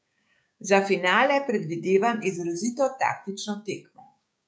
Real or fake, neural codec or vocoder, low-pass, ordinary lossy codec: fake; codec, 16 kHz, 6 kbps, DAC; none; none